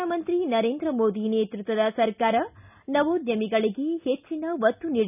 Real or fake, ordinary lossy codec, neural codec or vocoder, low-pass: real; none; none; 3.6 kHz